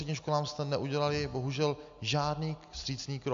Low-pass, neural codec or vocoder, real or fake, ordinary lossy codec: 7.2 kHz; none; real; MP3, 64 kbps